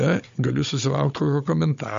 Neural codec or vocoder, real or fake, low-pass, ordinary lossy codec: codec, 16 kHz, 16 kbps, FunCodec, trained on Chinese and English, 50 frames a second; fake; 7.2 kHz; MP3, 48 kbps